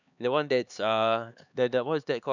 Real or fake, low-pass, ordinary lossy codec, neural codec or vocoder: fake; 7.2 kHz; none; codec, 16 kHz, 4 kbps, X-Codec, HuBERT features, trained on LibriSpeech